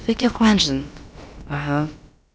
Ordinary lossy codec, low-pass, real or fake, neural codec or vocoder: none; none; fake; codec, 16 kHz, about 1 kbps, DyCAST, with the encoder's durations